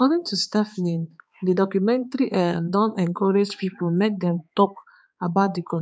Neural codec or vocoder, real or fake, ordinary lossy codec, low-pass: codec, 16 kHz, 4 kbps, X-Codec, HuBERT features, trained on balanced general audio; fake; none; none